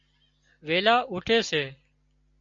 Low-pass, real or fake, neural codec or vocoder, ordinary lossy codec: 7.2 kHz; real; none; MP3, 48 kbps